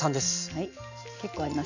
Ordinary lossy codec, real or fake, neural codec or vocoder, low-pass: none; real; none; 7.2 kHz